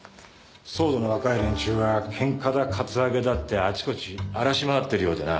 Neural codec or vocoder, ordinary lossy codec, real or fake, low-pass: none; none; real; none